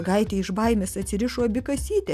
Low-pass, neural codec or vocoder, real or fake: 14.4 kHz; none; real